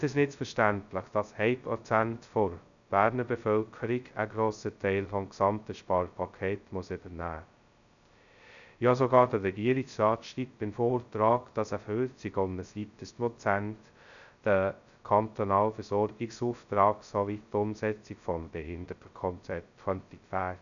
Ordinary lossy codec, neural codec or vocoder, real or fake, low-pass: none; codec, 16 kHz, 0.2 kbps, FocalCodec; fake; 7.2 kHz